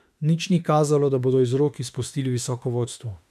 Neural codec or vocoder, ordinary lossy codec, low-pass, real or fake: autoencoder, 48 kHz, 32 numbers a frame, DAC-VAE, trained on Japanese speech; none; 14.4 kHz; fake